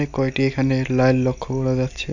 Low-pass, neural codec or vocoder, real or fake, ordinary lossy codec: 7.2 kHz; none; real; none